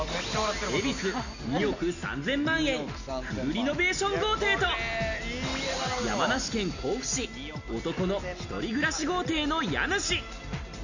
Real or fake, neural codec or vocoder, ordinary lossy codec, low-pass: real; none; none; 7.2 kHz